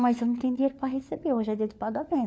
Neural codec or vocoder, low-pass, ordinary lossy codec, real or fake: codec, 16 kHz, 4 kbps, FunCodec, trained on LibriTTS, 50 frames a second; none; none; fake